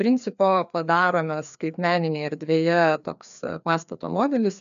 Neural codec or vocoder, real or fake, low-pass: codec, 16 kHz, 2 kbps, FreqCodec, larger model; fake; 7.2 kHz